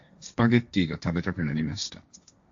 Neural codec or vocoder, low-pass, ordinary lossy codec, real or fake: codec, 16 kHz, 1.1 kbps, Voila-Tokenizer; 7.2 kHz; AAC, 64 kbps; fake